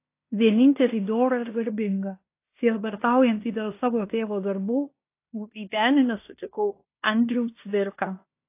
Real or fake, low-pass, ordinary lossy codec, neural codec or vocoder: fake; 3.6 kHz; AAC, 24 kbps; codec, 16 kHz in and 24 kHz out, 0.9 kbps, LongCat-Audio-Codec, fine tuned four codebook decoder